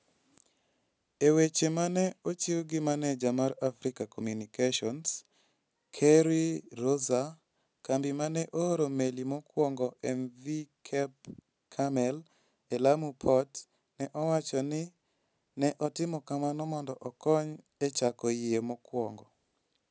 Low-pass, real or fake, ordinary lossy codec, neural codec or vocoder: none; real; none; none